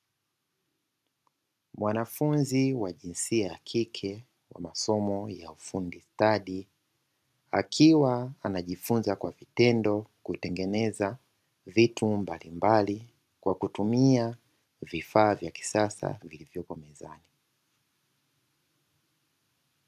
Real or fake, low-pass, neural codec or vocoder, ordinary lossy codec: real; 14.4 kHz; none; MP3, 96 kbps